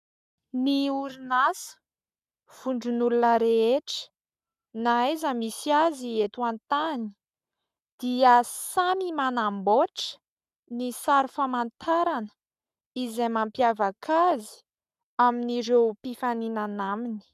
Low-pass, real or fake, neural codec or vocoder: 14.4 kHz; fake; codec, 44.1 kHz, 7.8 kbps, Pupu-Codec